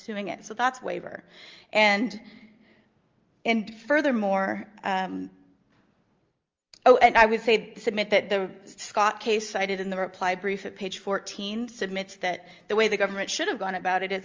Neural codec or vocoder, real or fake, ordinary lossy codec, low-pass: none; real; Opus, 32 kbps; 7.2 kHz